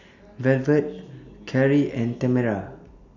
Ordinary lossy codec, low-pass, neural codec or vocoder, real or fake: none; 7.2 kHz; none; real